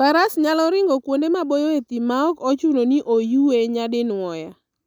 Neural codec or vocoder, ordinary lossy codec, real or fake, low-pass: none; none; real; 19.8 kHz